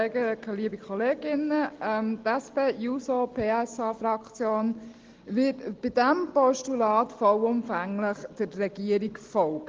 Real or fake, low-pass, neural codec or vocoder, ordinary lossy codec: real; 7.2 kHz; none; Opus, 16 kbps